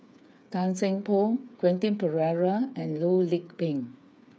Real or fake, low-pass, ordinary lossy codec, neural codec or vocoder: fake; none; none; codec, 16 kHz, 8 kbps, FreqCodec, smaller model